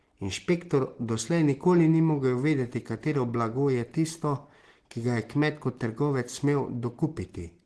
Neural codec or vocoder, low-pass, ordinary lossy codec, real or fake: none; 10.8 kHz; Opus, 16 kbps; real